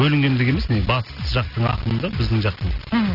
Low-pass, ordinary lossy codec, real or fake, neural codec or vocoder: 5.4 kHz; none; real; none